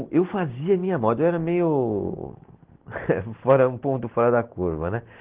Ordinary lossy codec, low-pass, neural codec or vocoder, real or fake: Opus, 16 kbps; 3.6 kHz; none; real